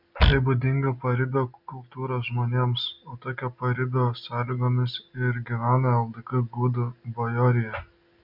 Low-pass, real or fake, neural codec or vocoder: 5.4 kHz; real; none